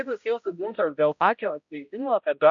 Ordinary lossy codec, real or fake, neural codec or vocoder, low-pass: MP3, 64 kbps; fake; codec, 16 kHz, 0.5 kbps, X-Codec, HuBERT features, trained on balanced general audio; 7.2 kHz